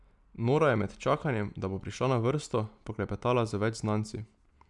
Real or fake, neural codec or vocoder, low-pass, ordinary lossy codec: real; none; 10.8 kHz; none